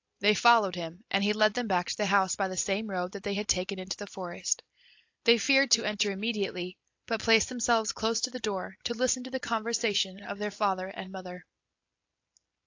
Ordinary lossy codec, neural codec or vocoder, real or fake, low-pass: AAC, 48 kbps; none; real; 7.2 kHz